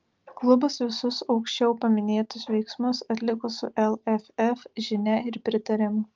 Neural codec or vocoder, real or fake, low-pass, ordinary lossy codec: none; real; 7.2 kHz; Opus, 32 kbps